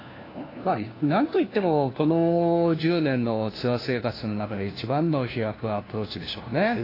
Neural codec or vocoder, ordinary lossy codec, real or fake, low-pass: codec, 16 kHz, 1 kbps, FunCodec, trained on LibriTTS, 50 frames a second; AAC, 24 kbps; fake; 5.4 kHz